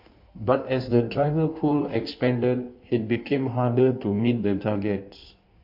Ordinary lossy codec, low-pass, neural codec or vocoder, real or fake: MP3, 48 kbps; 5.4 kHz; codec, 16 kHz in and 24 kHz out, 1.1 kbps, FireRedTTS-2 codec; fake